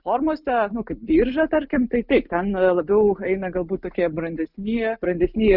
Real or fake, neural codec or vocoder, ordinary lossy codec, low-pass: real; none; Opus, 64 kbps; 5.4 kHz